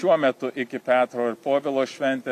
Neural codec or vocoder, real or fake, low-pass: none; real; 14.4 kHz